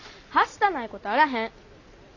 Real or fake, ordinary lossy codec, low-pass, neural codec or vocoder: real; none; 7.2 kHz; none